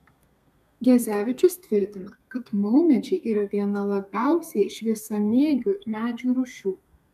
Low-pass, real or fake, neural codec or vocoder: 14.4 kHz; fake; codec, 32 kHz, 1.9 kbps, SNAC